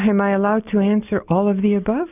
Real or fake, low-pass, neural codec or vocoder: real; 3.6 kHz; none